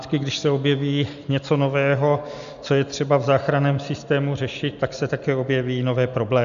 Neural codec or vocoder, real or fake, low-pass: none; real; 7.2 kHz